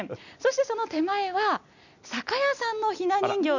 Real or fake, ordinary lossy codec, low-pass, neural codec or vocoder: real; none; 7.2 kHz; none